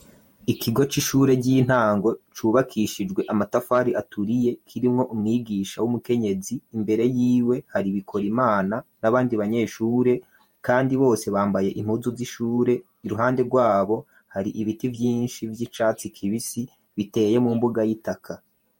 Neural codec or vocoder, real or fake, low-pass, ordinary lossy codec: vocoder, 48 kHz, 128 mel bands, Vocos; fake; 19.8 kHz; MP3, 64 kbps